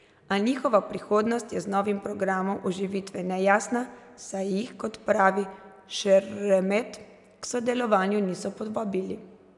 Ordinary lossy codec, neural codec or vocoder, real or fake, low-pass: none; none; real; 10.8 kHz